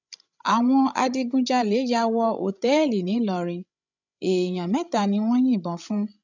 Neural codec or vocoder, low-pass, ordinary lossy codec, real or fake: codec, 16 kHz, 16 kbps, FreqCodec, larger model; 7.2 kHz; none; fake